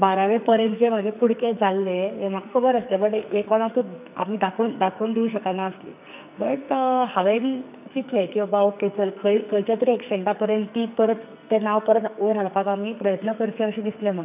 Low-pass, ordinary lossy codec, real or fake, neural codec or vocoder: 3.6 kHz; none; fake; codec, 44.1 kHz, 2.6 kbps, SNAC